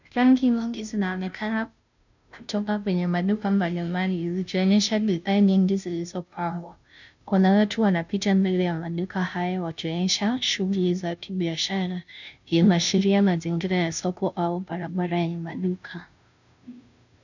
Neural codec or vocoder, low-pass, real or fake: codec, 16 kHz, 0.5 kbps, FunCodec, trained on Chinese and English, 25 frames a second; 7.2 kHz; fake